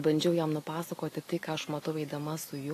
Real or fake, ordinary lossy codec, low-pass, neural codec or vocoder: real; AAC, 48 kbps; 14.4 kHz; none